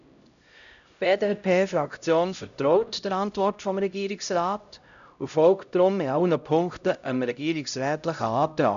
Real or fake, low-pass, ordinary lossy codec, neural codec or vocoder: fake; 7.2 kHz; none; codec, 16 kHz, 0.5 kbps, X-Codec, HuBERT features, trained on LibriSpeech